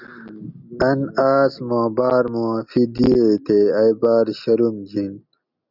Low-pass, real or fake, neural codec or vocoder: 5.4 kHz; real; none